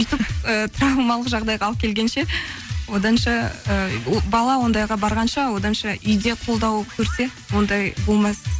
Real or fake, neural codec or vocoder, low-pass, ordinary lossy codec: real; none; none; none